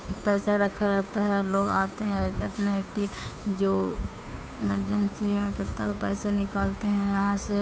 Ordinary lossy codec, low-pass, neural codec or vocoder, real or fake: none; none; codec, 16 kHz, 2 kbps, FunCodec, trained on Chinese and English, 25 frames a second; fake